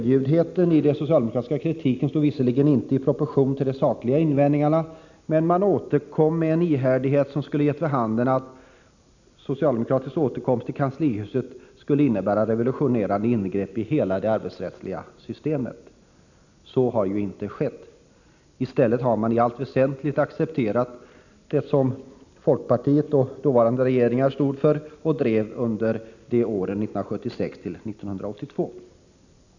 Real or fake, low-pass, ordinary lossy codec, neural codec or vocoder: real; 7.2 kHz; none; none